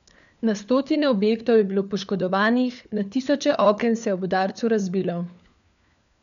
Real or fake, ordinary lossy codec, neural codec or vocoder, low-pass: fake; none; codec, 16 kHz, 4 kbps, FunCodec, trained on LibriTTS, 50 frames a second; 7.2 kHz